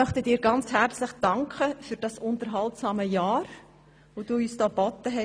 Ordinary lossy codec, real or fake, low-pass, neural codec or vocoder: none; real; none; none